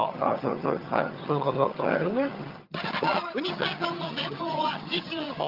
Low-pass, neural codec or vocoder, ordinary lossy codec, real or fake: 5.4 kHz; vocoder, 22.05 kHz, 80 mel bands, HiFi-GAN; Opus, 24 kbps; fake